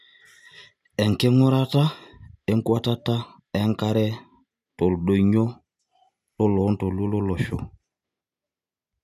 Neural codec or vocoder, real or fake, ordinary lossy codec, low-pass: none; real; none; 14.4 kHz